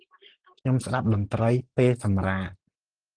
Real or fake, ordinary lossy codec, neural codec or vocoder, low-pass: real; Opus, 16 kbps; none; 9.9 kHz